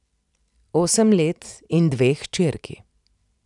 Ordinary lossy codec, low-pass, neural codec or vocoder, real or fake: none; 10.8 kHz; none; real